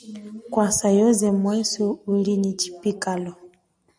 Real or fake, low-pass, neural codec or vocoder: real; 9.9 kHz; none